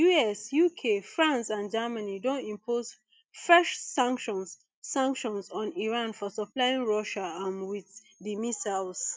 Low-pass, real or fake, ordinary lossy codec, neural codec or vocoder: none; real; none; none